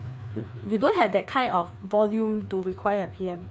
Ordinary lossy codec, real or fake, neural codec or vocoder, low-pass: none; fake; codec, 16 kHz, 2 kbps, FreqCodec, larger model; none